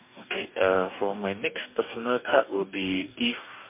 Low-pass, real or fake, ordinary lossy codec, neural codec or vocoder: 3.6 kHz; fake; MP3, 32 kbps; codec, 44.1 kHz, 2.6 kbps, DAC